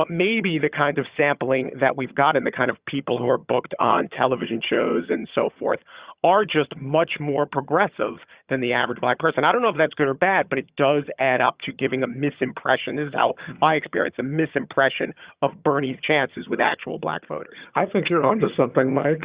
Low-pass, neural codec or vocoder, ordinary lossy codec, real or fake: 3.6 kHz; vocoder, 22.05 kHz, 80 mel bands, HiFi-GAN; Opus, 64 kbps; fake